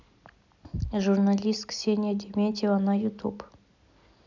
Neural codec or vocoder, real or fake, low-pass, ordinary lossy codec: none; real; 7.2 kHz; none